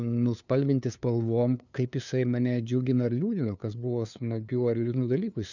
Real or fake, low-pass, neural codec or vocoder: fake; 7.2 kHz; codec, 16 kHz, 2 kbps, FunCodec, trained on LibriTTS, 25 frames a second